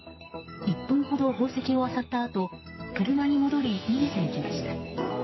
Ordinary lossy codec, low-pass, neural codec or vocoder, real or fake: MP3, 24 kbps; 7.2 kHz; codec, 44.1 kHz, 2.6 kbps, SNAC; fake